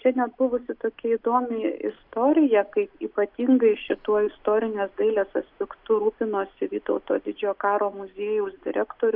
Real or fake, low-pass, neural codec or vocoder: real; 5.4 kHz; none